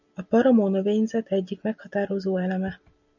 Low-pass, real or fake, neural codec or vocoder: 7.2 kHz; real; none